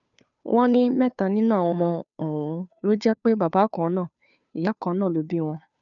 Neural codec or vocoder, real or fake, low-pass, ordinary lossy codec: codec, 16 kHz, 2 kbps, FunCodec, trained on Chinese and English, 25 frames a second; fake; 7.2 kHz; none